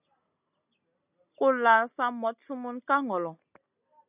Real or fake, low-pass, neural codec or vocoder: real; 3.6 kHz; none